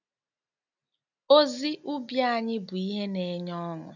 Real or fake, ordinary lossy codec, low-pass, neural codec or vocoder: real; none; 7.2 kHz; none